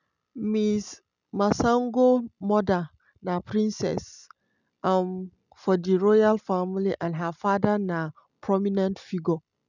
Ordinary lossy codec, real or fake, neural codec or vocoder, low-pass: none; real; none; 7.2 kHz